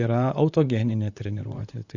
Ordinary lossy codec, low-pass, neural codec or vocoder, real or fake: Opus, 64 kbps; 7.2 kHz; vocoder, 44.1 kHz, 128 mel bands, Pupu-Vocoder; fake